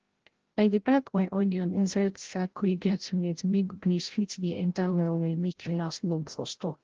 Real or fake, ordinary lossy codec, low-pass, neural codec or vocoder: fake; Opus, 16 kbps; 7.2 kHz; codec, 16 kHz, 0.5 kbps, FreqCodec, larger model